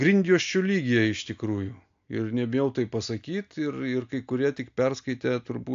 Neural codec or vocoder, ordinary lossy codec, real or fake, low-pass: none; AAC, 64 kbps; real; 7.2 kHz